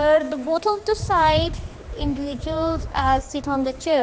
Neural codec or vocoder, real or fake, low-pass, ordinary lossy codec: codec, 16 kHz, 2 kbps, X-Codec, HuBERT features, trained on general audio; fake; none; none